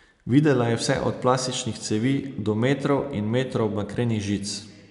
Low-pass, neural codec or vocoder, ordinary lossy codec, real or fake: 10.8 kHz; none; none; real